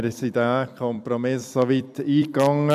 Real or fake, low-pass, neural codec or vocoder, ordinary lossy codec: fake; 14.4 kHz; vocoder, 44.1 kHz, 128 mel bands every 256 samples, BigVGAN v2; none